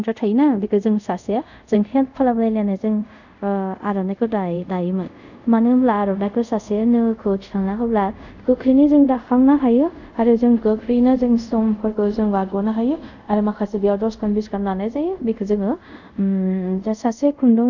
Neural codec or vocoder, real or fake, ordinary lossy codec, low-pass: codec, 24 kHz, 0.5 kbps, DualCodec; fake; none; 7.2 kHz